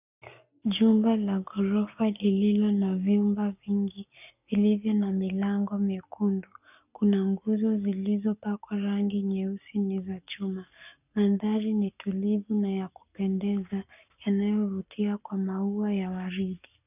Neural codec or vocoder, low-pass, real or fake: codec, 44.1 kHz, 7.8 kbps, Pupu-Codec; 3.6 kHz; fake